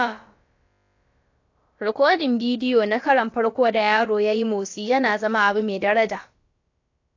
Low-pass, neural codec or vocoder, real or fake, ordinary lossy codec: 7.2 kHz; codec, 16 kHz, about 1 kbps, DyCAST, with the encoder's durations; fake; AAC, 48 kbps